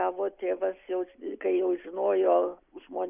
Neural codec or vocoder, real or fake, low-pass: none; real; 3.6 kHz